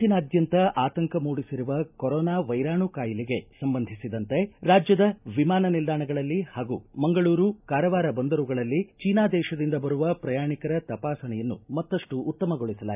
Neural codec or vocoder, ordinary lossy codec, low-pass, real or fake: none; none; 3.6 kHz; real